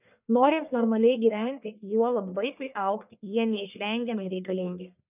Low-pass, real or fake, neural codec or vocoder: 3.6 kHz; fake; codec, 44.1 kHz, 1.7 kbps, Pupu-Codec